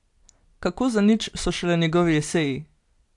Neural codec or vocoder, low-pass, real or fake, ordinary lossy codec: none; 10.8 kHz; real; AAC, 64 kbps